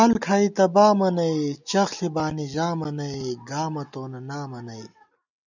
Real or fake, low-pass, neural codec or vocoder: real; 7.2 kHz; none